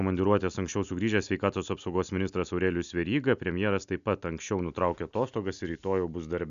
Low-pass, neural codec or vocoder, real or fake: 7.2 kHz; none; real